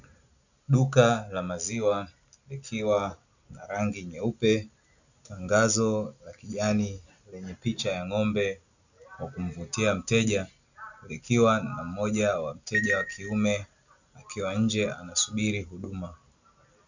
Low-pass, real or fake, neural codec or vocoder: 7.2 kHz; real; none